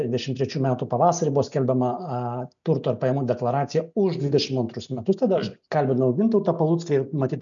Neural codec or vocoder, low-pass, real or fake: none; 7.2 kHz; real